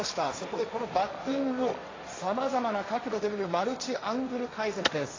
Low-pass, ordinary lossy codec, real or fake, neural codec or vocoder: 7.2 kHz; AAC, 32 kbps; fake; codec, 16 kHz, 1.1 kbps, Voila-Tokenizer